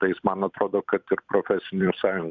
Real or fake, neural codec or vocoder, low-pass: fake; vocoder, 44.1 kHz, 128 mel bands every 256 samples, BigVGAN v2; 7.2 kHz